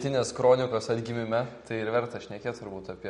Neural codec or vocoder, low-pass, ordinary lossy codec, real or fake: none; 19.8 kHz; MP3, 48 kbps; real